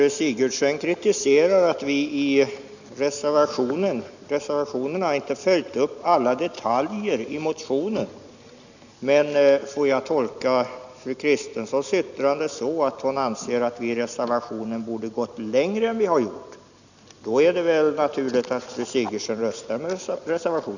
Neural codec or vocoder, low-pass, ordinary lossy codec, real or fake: none; 7.2 kHz; none; real